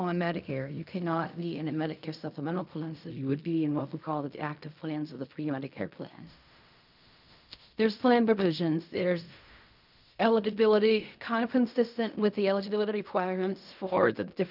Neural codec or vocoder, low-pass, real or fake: codec, 16 kHz in and 24 kHz out, 0.4 kbps, LongCat-Audio-Codec, fine tuned four codebook decoder; 5.4 kHz; fake